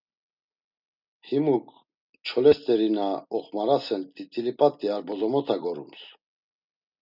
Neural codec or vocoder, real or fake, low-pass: none; real; 5.4 kHz